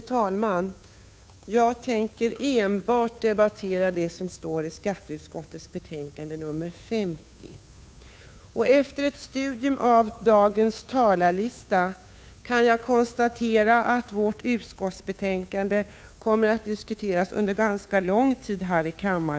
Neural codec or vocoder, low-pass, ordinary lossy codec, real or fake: codec, 16 kHz, 2 kbps, FunCodec, trained on Chinese and English, 25 frames a second; none; none; fake